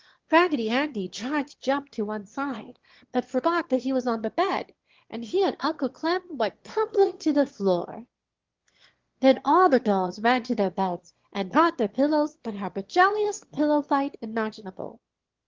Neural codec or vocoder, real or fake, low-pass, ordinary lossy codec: autoencoder, 22.05 kHz, a latent of 192 numbers a frame, VITS, trained on one speaker; fake; 7.2 kHz; Opus, 16 kbps